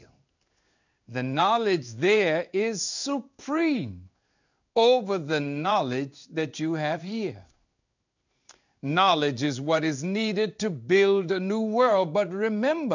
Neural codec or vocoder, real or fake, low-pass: codec, 16 kHz in and 24 kHz out, 1 kbps, XY-Tokenizer; fake; 7.2 kHz